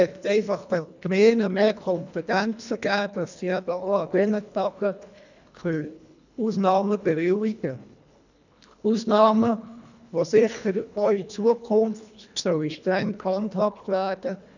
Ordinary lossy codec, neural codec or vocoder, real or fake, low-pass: none; codec, 24 kHz, 1.5 kbps, HILCodec; fake; 7.2 kHz